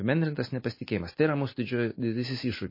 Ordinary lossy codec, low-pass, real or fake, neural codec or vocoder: MP3, 24 kbps; 5.4 kHz; real; none